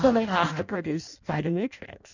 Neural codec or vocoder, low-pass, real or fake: codec, 16 kHz in and 24 kHz out, 0.6 kbps, FireRedTTS-2 codec; 7.2 kHz; fake